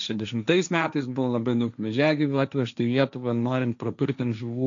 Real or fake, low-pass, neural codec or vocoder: fake; 7.2 kHz; codec, 16 kHz, 1.1 kbps, Voila-Tokenizer